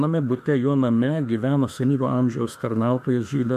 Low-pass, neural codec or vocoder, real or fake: 14.4 kHz; autoencoder, 48 kHz, 32 numbers a frame, DAC-VAE, trained on Japanese speech; fake